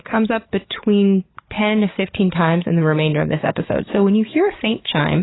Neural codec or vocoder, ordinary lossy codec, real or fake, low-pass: codec, 44.1 kHz, 7.8 kbps, DAC; AAC, 16 kbps; fake; 7.2 kHz